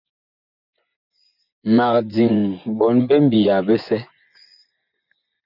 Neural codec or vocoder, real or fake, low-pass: vocoder, 24 kHz, 100 mel bands, Vocos; fake; 5.4 kHz